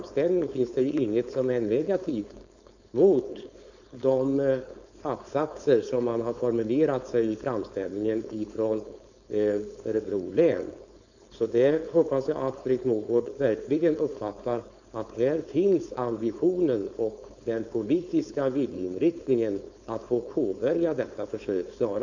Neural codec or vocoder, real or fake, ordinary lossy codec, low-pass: codec, 16 kHz, 4.8 kbps, FACodec; fake; none; 7.2 kHz